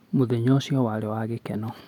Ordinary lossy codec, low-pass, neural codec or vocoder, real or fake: none; 19.8 kHz; none; real